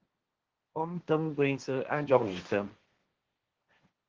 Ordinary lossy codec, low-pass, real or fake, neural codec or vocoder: Opus, 16 kbps; 7.2 kHz; fake; codec, 16 kHz, 1.1 kbps, Voila-Tokenizer